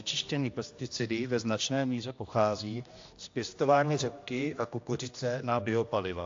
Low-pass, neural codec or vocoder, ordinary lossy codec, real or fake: 7.2 kHz; codec, 16 kHz, 1 kbps, X-Codec, HuBERT features, trained on general audio; AAC, 48 kbps; fake